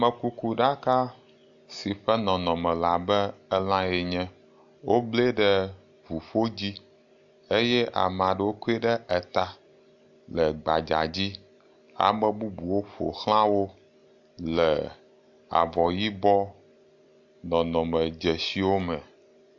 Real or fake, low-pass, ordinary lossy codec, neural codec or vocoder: real; 7.2 kHz; AAC, 64 kbps; none